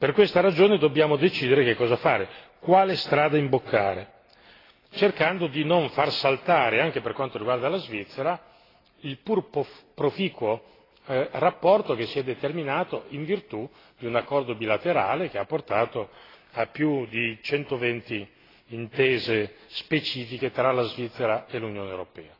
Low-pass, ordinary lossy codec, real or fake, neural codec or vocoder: 5.4 kHz; AAC, 24 kbps; real; none